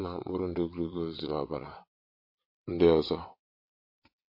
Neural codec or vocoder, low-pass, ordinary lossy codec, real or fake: vocoder, 22.05 kHz, 80 mel bands, WaveNeXt; 5.4 kHz; MP3, 32 kbps; fake